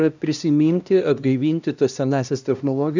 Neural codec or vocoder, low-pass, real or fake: codec, 16 kHz, 1 kbps, X-Codec, HuBERT features, trained on LibriSpeech; 7.2 kHz; fake